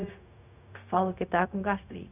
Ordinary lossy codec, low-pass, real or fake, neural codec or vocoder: none; 3.6 kHz; fake; codec, 16 kHz, 0.4 kbps, LongCat-Audio-Codec